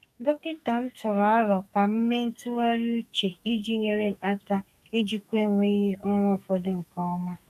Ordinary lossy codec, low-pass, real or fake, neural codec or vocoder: none; 14.4 kHz; fake; codec, 44.1 kHz, 2.6 kbps, SNAC